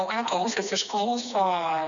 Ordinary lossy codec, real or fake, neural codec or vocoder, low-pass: MP3, 96 kbps; fake; codec, 16 kHz, 2 kbps, FreqCodec, smaller model; 7.2 kHz